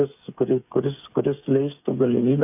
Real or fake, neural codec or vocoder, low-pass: fake; codec, 16 kHz, 8 kbps, FreqCodec, smaller model; 3.6 kHz